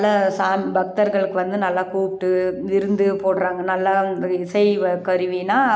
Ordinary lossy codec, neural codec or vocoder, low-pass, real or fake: none; none; none; real